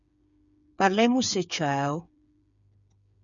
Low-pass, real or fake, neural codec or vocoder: 7.2 kHz; fake; codec, 16 kHz, 8 kbps, FreqCodec, smaller model